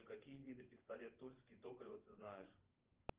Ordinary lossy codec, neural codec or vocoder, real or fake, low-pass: Opus, 16 kbps; vocoder, 24 kHz, 100 mel bands, Vocos; fake; 3.6 kHz